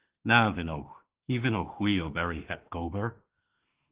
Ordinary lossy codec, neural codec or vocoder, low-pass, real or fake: Opus, 16 kbps; autoencoder, 48 kHz, 32 numbers a frame, DAC-VAE, trained on Japanese speech; 3.6 kHz; fake